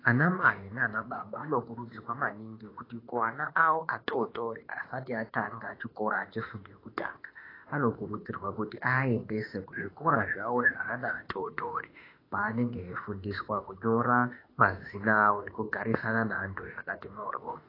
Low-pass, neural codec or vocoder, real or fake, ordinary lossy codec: 5.4 kHz; autoencoder, 48 kHz, 32 numbers a frame, DAC-VAE, trained on Japanese speech; fake; AAC, 24 kbps